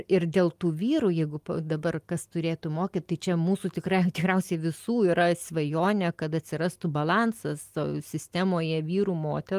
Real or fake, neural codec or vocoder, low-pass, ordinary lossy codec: real; none; 14.4 kHz; Opus, 32 kbps